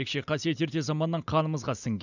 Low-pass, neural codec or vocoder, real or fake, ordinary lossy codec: 7.2 kHz; none; real; none